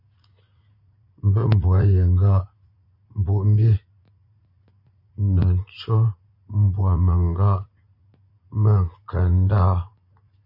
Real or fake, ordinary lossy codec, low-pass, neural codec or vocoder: fake; MP3, 32 kbps; 5.4 kHz; vocoder, 44.1 kHz, 80 mel bands, Vocos